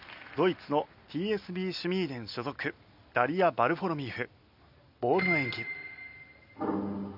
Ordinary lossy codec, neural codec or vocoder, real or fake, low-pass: none; none; real; 5.4 kHz